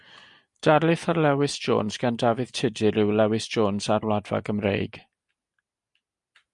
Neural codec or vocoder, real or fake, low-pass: none; real; 10.8 kHz